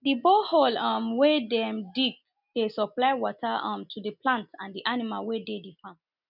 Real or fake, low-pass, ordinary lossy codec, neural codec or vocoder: real; 5.4 kHz; none; none